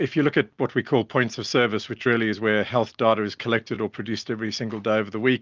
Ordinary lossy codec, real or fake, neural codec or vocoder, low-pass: Opus, 24 kbps; real; none; 7.2 kHz